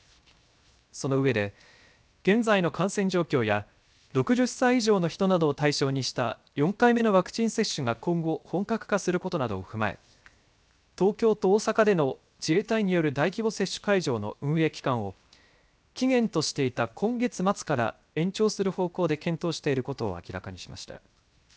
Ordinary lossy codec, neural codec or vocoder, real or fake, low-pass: none; codec, 16 kHz, 0.7 kbps, FocalCodec; fake; none